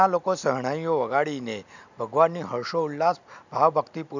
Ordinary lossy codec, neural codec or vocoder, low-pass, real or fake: none; none; 7.2 kHz; real